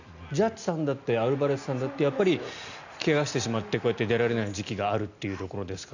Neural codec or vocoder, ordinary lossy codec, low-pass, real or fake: none; none; 7.2 kHz; real